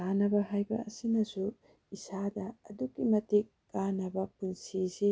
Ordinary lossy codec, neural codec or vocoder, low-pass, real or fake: none; none; none; real